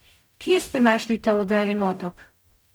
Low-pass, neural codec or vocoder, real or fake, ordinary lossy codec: none; codec, 44.1 kHz, 0.9 kbps, DAC; fake; none